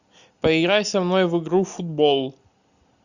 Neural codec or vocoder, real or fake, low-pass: none; real; 7.2 kHz